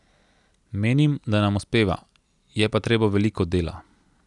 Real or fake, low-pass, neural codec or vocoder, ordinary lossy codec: real; 10.8 kHz; none; none